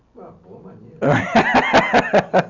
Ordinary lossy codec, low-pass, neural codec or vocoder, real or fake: none; 7.2 kHz; none; real